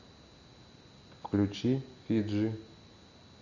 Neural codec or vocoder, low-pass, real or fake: none; 7.2 kHz; real